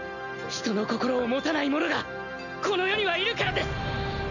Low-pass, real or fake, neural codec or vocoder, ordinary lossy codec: 7.2 kHz; real; none; none